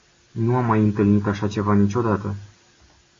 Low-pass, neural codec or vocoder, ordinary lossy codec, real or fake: 7.2 kHz; none; AAC, 32 kbps; real